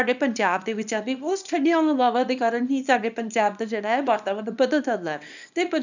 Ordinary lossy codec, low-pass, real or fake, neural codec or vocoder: none; 7.2 kHz; fake; codec, 24 kHz, 0.9 kbps, WavTokenizer, small release